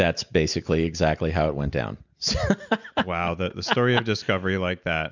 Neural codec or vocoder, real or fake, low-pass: none; real; 7.2 kHz